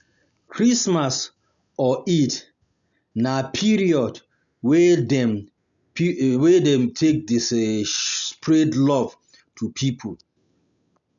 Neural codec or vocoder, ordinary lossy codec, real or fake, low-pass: none; none; real; 7.2 kHz